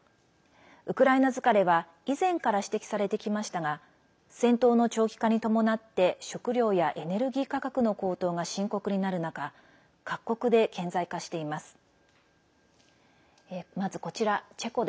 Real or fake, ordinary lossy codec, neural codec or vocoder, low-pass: real; none; none; none